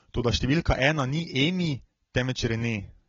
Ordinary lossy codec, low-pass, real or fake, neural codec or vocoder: AAC, 24 kbps; 7.2 kHz; real; none